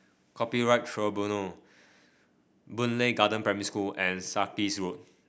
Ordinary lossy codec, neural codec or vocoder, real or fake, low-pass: none; none; real; none